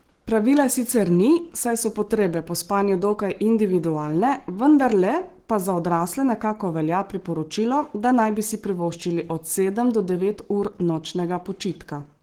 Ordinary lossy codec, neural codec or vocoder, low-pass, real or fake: Opus, 16 kbps; codec, 44.1 kHz, 7.8 kbps, Pupu-Codec; 19.8 kHz; fake